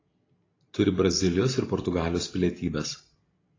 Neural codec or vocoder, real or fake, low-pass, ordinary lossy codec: none; real; 7.2 kHz; AAC, 32 kbps